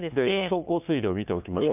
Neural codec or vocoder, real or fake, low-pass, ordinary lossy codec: codec, 16 kHz, 2 kbps, FunCodec, trained on LibriTTS, 25 frames a second; fake; 3.6 kHz; none